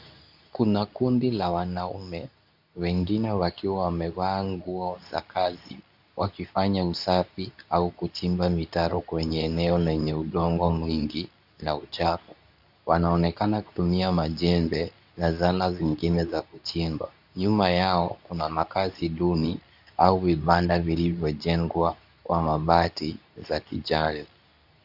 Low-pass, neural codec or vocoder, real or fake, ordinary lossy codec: 5.4 kHz; codec, 24 kHz, 0.9 kbps, WavTokenizer, medium speech release version 2; fake; AAC, 48 kbps